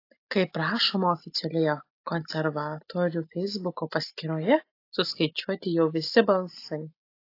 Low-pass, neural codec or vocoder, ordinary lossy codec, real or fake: 5.4 kHz; none; AAC, 32 kbps; real